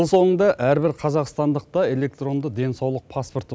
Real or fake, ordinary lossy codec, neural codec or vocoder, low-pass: real; none; none; none